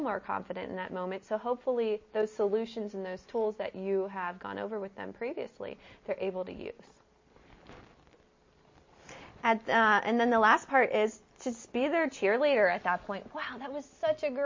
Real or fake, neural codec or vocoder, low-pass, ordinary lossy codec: real; none; 7.2 kHz; MP3, 32 kbps